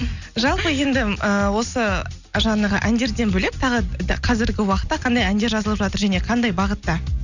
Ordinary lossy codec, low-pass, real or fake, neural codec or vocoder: none; 7.2 kHz; real; none